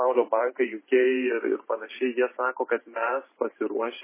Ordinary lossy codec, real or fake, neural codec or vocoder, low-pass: MP3, 16 kbps; fake; vocoder, 44.1 kHz, 128 mel bands every 512 samples, BigVGAN v2; 3.6 kHz